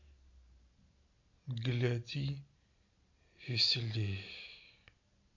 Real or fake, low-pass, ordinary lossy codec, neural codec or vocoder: real; 7.2 kHz; MP3, 48 kbps; none